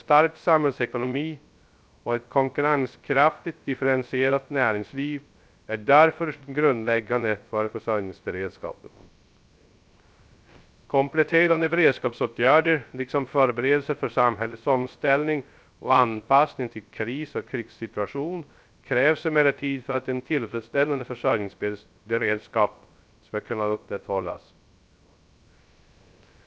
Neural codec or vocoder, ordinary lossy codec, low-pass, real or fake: codec, 16 kHz, 0.3 kbps, FocalCodec; none; none; fake